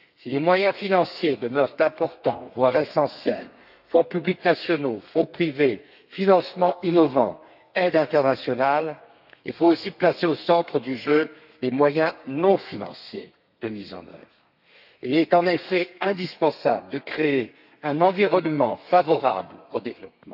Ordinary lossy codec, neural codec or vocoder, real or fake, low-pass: none; codec, 32 kHz, 1.9 kbps, SNAC; fake; 5.4 kHz